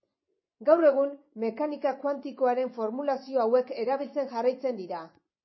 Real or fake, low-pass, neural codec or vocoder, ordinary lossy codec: fake; 7.2 kHz; vocoder, 24 kHz, 100 mel bands, Vocos; MP3, 24 kbps